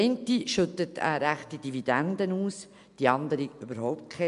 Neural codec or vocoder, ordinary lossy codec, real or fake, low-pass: none; MP3, 64 kbps; real; 10.8 kHz